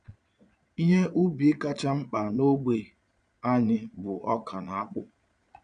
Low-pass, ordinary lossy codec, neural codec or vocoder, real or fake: 9.9 kHz; MP3, 96 kbps; vocoder, 22.05 kHz, 80 mel bands, Vocos; fake